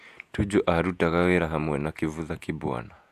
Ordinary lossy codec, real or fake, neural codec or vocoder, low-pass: AAC, 96 kbps; fake; vocoder, 48 kHz, 128 mel bands, Vocos; 14.4 kHz